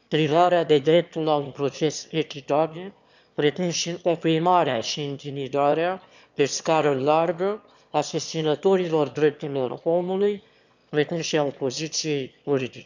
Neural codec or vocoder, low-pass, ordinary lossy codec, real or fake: autoencoder, 22.05 kHz, a latent of 192 numbers a frame, VITS, trained on one speaker; 7.2 kHz; none; fake